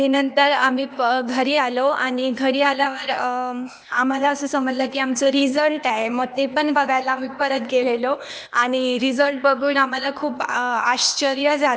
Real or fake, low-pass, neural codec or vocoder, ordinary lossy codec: fake; none; codec, 16 kHz, 0.8 kbps, ZipCodec; none